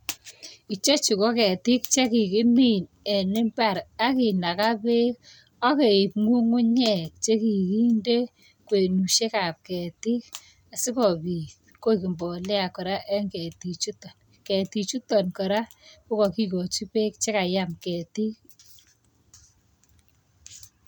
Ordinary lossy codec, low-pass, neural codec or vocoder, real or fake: none; none; none; real